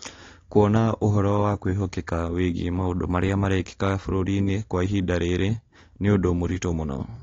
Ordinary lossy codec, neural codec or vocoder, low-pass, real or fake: AAC, 32 kbps; none; 7.2 kHz; real